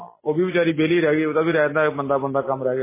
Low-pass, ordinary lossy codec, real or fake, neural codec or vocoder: 3.6 kHz; MP3, 16 kbps; real; none